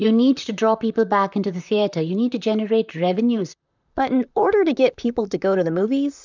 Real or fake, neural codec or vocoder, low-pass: fake; vocoder, 44.1 kHz, 128 mel bands, Pupu-Vocoder; 7.2 kHz